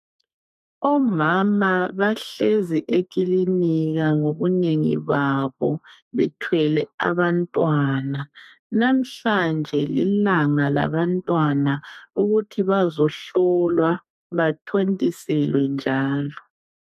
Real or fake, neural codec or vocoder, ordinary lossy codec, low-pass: fake; codec, 32 kHz, 1.9 kbps, SNAC; AAC, 96 kbps; 14.4 kHz